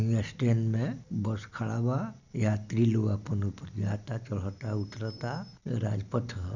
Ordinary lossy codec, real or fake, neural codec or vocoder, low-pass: none; real; none; 7.2 kHz